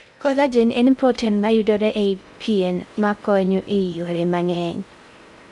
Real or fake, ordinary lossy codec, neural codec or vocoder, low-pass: fake; none; codec, 16 kHz in and 24 kHz out, 0.6 kbps, FocalCodec, streaming, 2048 codes; 10.8 kHz